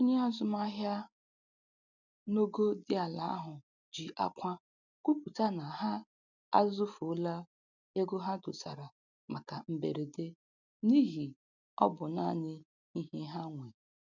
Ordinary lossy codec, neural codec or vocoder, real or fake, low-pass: none; none; real; 7.2 kHz